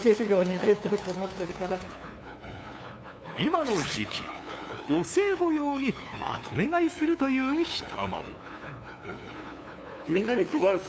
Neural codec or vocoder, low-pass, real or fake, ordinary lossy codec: codec, 16 kHz, 2 kbps, FunCodec, trained on LibriTTS, 25 frames a second; none; fake; none